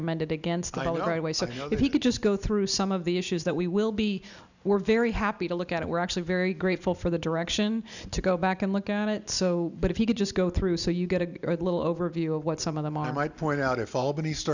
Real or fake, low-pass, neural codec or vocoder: real; 7.2 kHz; none